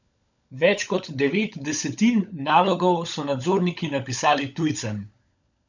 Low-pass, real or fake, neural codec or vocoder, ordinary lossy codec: 7.2 kHz; fake; codec, 16 kHz, 16 kbps, FunCodec, trained on LibriTTS, 50 frames a second; none